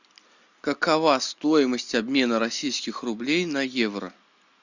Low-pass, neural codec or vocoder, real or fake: 7.2 kHz; none; real